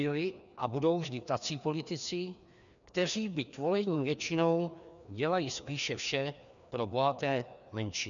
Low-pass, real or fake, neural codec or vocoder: 7.2 kHz; fake; codec, 16 kHz, 2 kbps, FreqCodec, larger model